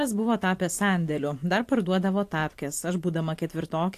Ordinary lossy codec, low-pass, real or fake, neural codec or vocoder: AAC, 64 kbps; 14.4 kHz; fake; vocoder, 44.1 kHz, 128 mel bands every 512 samples, BigVGAN v2